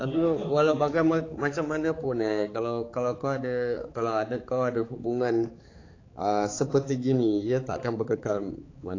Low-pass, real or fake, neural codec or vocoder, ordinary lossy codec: 7.2 kHz; fake; codec, 16 kHz, 4 kbps, X-Codec, HuBERT features, trained on balanced general audio; AAC, 32 kbps